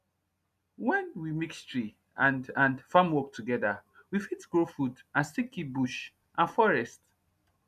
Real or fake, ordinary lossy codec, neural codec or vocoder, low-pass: real; MP3, 96 kbps; none; 14.4 kHz